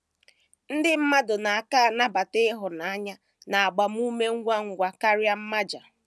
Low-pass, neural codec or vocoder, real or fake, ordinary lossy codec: none; none; real; none